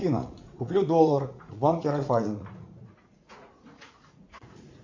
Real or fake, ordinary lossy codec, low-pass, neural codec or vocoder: fake; MP3, 64 kbps; 7.2 kHz; vocoder, 44.1 kHz, 80 mel bands, Vocos